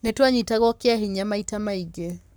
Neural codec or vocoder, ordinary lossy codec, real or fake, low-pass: codec, 44.1 kHz, 7.8 kbps, Pupu-Codec; none; fake; none